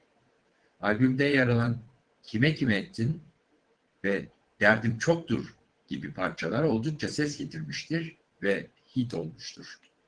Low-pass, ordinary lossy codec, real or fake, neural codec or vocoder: 9.9 kHz; Opus, 16 kbps; fake; vocoder, 22.05 kHz, 80 mel bands, WaveNeXt